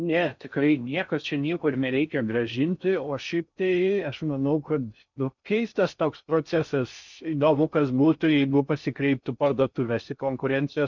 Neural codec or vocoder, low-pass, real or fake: codec, 16 kHz in and 24 kHz out, 0.6 kbps, FocalCodec, streaming, 4096 codes; 7.2 kHz; fake